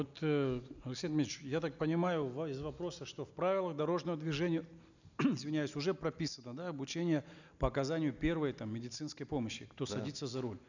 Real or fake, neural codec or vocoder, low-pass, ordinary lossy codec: real; none; 7.2 kHz; none